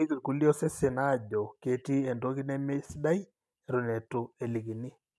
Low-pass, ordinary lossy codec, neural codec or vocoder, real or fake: none; none; none; real